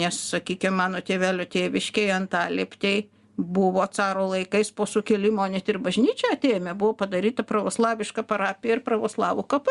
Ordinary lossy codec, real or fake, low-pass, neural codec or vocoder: Opus, 64 kbps; real; 10.8 kHz; none